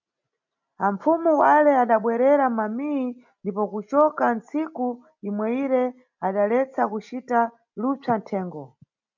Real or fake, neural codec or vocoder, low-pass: real; none; 7.2 kHz